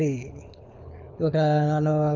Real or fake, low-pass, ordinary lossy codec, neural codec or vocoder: fake; 7.2 kHz; none; codec, 24 kHz, 6 kbps, HILCodec